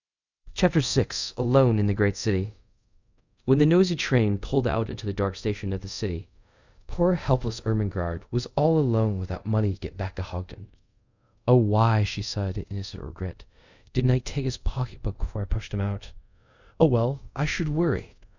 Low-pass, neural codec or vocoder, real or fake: 7.2 kHz; codec, 24 kHz, 0.5 kbps, DualCodec; fake